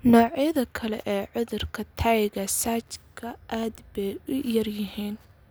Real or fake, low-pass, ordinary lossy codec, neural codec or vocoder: real; none; none; none